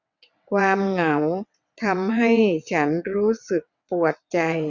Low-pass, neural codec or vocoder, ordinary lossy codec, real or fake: 7.2 kHz; vocoder, 22.05 kHz, 80 mel bands, WaveNeXt; none; fake